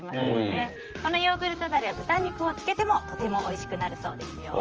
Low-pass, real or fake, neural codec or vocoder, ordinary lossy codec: 7.2 kHz; fake; vocoder, 44.1 kHz, 128 mel bands, Pupu-Vocoder; Opus, 24 kbps